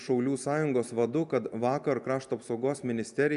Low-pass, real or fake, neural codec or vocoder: 10.8 kHz; real; none